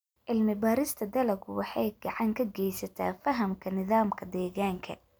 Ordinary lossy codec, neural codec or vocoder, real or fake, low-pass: none; none; real; none